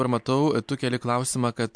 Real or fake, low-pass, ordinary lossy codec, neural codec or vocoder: real; 9.9 kHz; MP3, 64 kbps; none